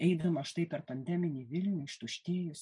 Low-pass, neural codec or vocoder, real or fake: 10.8 kHz; none; real